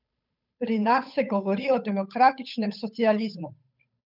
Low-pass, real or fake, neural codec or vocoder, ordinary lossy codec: 5.4 kHz; fake; codec, 16 kHz, 8 kbps, FunCodec, trained on Chinese and English, 25 frames a second; none